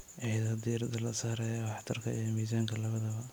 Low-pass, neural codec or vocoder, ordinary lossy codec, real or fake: none; none; none; real